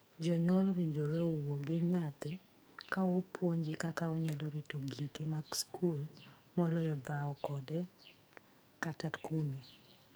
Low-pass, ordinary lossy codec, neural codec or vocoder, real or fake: none; none; codec, 44.1 kHz, 2.6 kbps, SNAC; fake